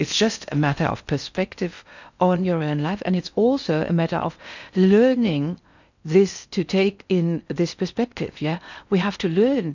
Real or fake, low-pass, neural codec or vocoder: fake; 7.2 kHz; codec, 16 kHz in and 24 kHz out, 0.6 kbps, FocalCodec, streaming, 4096 codes